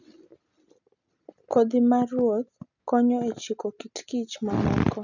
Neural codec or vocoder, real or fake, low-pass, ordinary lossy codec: none; real; 7.2 kHz; none